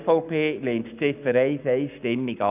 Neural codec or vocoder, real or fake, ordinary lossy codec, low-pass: codec, 44.1 kHz, 7.8 kbps, Pupu-Codec; fake; none; 3.6 kHz